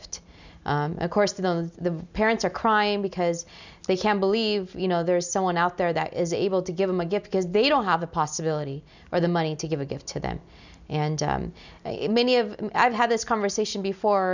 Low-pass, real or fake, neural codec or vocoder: 7.2 kHz; real; none